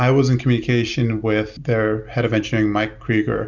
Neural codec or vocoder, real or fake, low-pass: none; real; 7.2 kHz